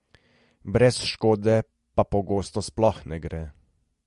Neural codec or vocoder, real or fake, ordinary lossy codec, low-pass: none; real; MP3, 48 kbps; 14.4 kHz